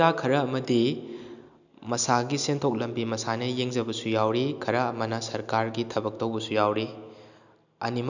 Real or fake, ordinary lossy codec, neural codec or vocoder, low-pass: real; none; none; 7.2 kHz